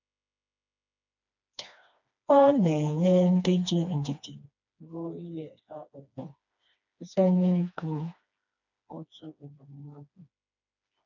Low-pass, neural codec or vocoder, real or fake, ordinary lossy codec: 7.2 kHz; codec, 16 kHz, 2 kbps, FreqCodec, smaller model; fake; none